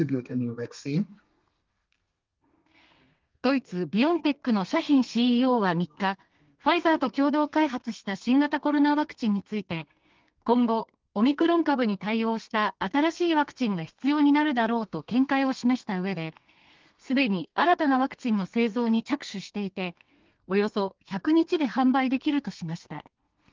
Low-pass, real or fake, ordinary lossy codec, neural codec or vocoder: 7.2 kHz; fake; Opus, 32 kbps; codec, 32 kHz, 1.9 kbps, SNAC